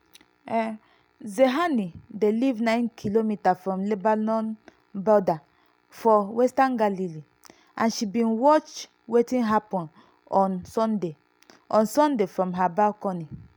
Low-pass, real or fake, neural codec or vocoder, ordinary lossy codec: none; real; none; none